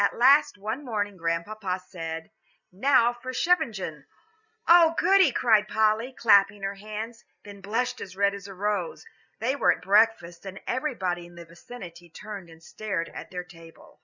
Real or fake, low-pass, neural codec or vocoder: real; 7.2 kHz; none